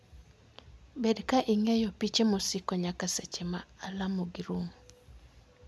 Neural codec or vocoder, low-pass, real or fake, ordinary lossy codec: none; none; real; none